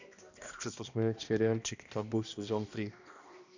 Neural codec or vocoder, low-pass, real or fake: codec, 16 kHz, 1 kbps, X-Codec, HuBERT features, trained on balanced general audio; 7.2 kHz; fake